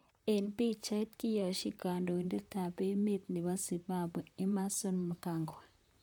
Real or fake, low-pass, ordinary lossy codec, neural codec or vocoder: fake; 19.8 kHz; none; vocoder, 44.1 kHz, 128 mel bands, Pupu-Vocoder